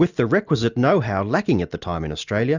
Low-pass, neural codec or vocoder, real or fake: 7.2 kHz; none; real